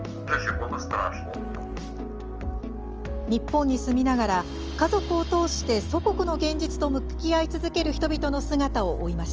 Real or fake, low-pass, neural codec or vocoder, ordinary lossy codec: real; 7.2 kHz; none; Opus, 24 kbps